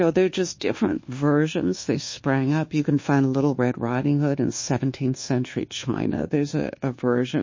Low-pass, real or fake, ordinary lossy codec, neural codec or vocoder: 7.2 kHz; fake; MP3, 32 kbps; codec, 24 kHz, 1.2 kbps, DualCodec